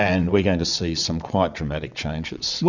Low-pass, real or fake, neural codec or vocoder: 7.2 kHz; fake; vocoder, 22.05 kHz, 80 mel bands, WaveNeXt